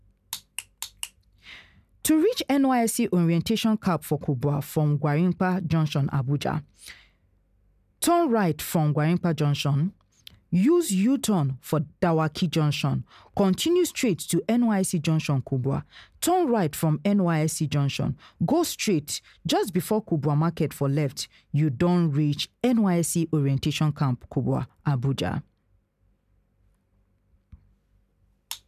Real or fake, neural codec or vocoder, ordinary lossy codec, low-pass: real; none; none; 14.4 kHz